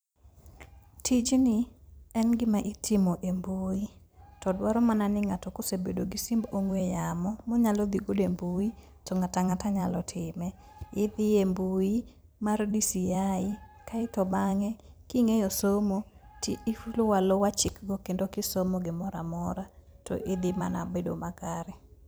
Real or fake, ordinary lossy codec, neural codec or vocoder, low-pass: fake; none; vocoder, 44.1 kHz, 128 mel bands every 256 samples, BigVGAN v2; none